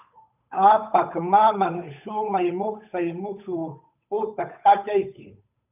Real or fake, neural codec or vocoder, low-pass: fake; codec, 16 kHz, 8 kbps, FunCodec, trained on Chinese and English, 25 frames a second; 3.6 kHz